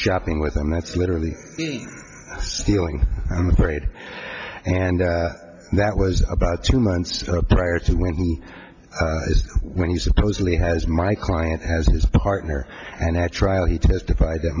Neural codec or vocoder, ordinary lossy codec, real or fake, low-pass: none; MP3, 64 kbps; real; 7.2 kHz